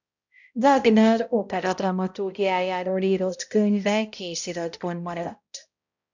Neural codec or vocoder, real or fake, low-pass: codec, 16 kHz, 0.5 kbps, X-Codec, HuBERT features, trained on balanced general audio; fake; 7.2 kHz